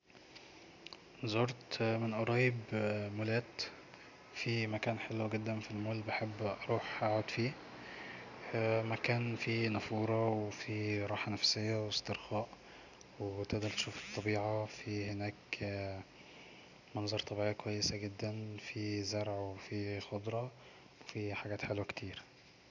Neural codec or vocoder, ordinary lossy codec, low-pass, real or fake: none; none; 7.2 kHz; real